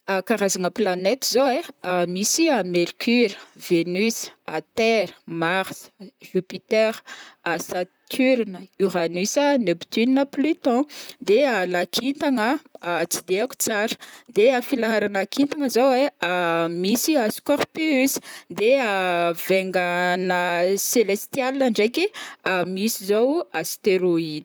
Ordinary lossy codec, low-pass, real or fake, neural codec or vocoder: none; none; fake; vocoder, 44.1 kHz, 128 mel bands, Pupu-Vocoder